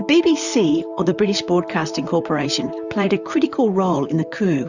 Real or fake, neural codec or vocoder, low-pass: fake; vocoder, 44.1 kHz, 128 mel bands, Pupu-Vocoder; 7.2 kHz